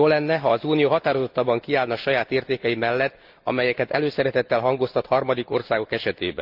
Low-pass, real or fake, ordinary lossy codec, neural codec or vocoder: 5.4 kHz; real; Opus, 24 kbps; none